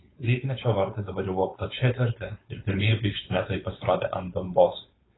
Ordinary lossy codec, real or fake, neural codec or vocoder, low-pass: AAC, 16 kbps; fake; codec, 16 kHz, 4.8 kbps, FACodec; 7.2 kHz